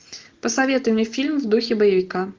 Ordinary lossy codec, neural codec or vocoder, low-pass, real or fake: Opus, 24 kbps; none; 7.2 kHz; real